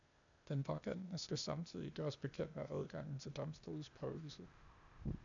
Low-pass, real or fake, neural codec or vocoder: 7.2 kHz; fake; codec, 16 kHz, 0.8 kbps, ZipCodec